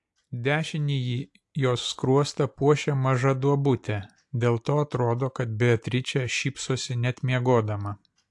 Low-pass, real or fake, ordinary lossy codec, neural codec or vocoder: 10.8 kHz; real; AAC, 64 kbps; none